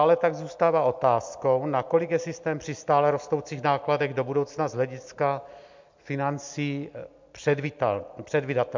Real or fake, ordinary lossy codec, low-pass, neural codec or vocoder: real; MP3, 64 kbps; 7.2 kHz; none